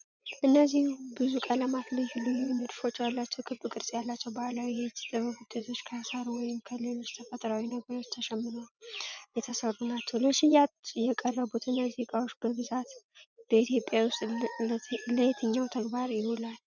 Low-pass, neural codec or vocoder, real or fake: 7.2 kHz; vocoder, 24 kHz, 100 mel bands, Vocos; fake